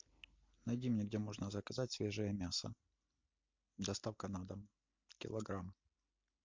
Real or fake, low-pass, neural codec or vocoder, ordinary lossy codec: real; 7.2 kHz; none; MP3, 48 kbps